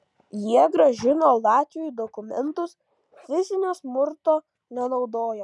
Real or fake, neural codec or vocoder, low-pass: real; none; 10.8 kHz